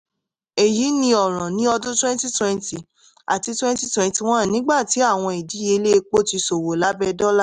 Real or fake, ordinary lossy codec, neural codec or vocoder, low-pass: real; none; none; 9.9 kHz